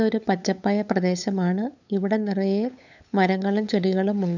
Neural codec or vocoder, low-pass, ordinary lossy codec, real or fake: codec, 16 kHz, 8 kbps, FunCodec, trained on LibriTTS, 25 frames a second; 7.2 kHz; none; fake